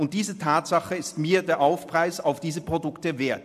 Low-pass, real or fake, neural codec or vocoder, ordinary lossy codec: 14.4 kHz; fake; vocoder, 44.1 kHz, 128 mel bands every 256 samples, BigVGAN v2; AAC, 96 kbps